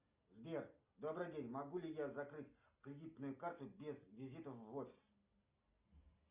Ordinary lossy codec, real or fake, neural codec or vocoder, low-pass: Opus, 64 kbps; real; none; 3.6 kHz